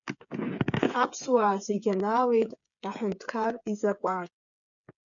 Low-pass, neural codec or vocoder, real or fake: 7.2 kHz; codec, 16 kHz, 8 kbps, FreqCodec, smaller model; fake